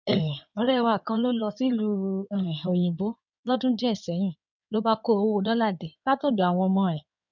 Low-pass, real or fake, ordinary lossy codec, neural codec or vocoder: 7.2 kHz; fake; none; codec, 16 kHz in and 24 kHz out, 2.2 kbps, FireRedTTS-2 codec